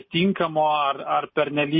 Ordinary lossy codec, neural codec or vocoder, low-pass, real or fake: MP3, 24 kbps; none; 7.2 kHz; real